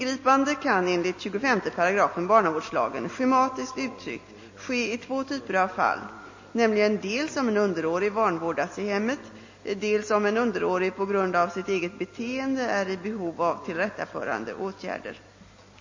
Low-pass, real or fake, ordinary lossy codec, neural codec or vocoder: 7.2 kHz; real; MP3, 32 kbps; none